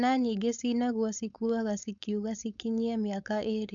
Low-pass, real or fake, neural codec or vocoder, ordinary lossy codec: 7.2 kHz; fake; codec, 16 kHz, 4.8 kbps, FACodec; none